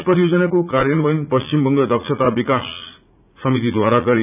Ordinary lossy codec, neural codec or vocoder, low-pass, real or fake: none; vocoder, 44.1 kHz, 80 mel bands, Vocos; 3.6 kHz; fake